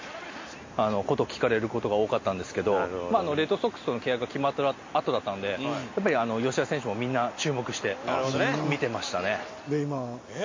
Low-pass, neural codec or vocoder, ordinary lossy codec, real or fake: 7.2 kHz; none; MP3, 32 kbps; real